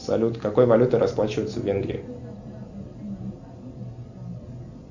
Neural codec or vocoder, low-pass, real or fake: none; 7.2 kHz; real